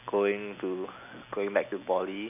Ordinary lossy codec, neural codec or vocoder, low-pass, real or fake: none; none; 3.6 kHz; real